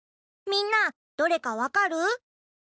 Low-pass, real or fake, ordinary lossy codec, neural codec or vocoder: none; real; none; none